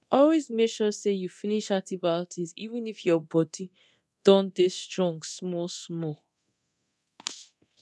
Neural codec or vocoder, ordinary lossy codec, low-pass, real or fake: codec, 24 kHz, 0.9 kbps, DualCodec; none; none; fake